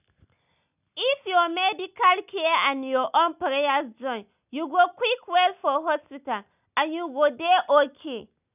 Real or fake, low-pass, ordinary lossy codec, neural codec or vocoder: real; 3.6 kHz; none; none